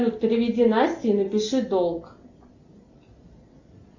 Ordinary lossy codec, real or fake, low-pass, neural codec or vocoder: Opus, 64 kbps; real; 7.2 kHz; none